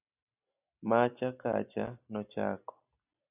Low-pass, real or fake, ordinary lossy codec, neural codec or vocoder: 3.6 kHz; real; AAC, 32 kbps; none